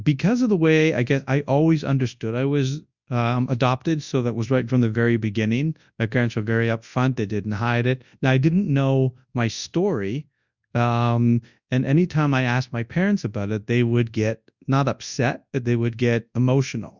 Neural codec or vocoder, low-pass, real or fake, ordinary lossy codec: codec, 24 kHz, 0.9 kbps, WavTokenizer, large speech release; 7.2 kHz; fake; Opus, 64 kbps